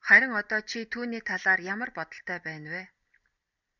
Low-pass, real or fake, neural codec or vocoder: 7.2 kHz; real; none